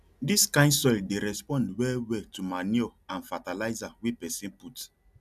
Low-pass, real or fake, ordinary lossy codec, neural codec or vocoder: 14.4 kHz; real; none; none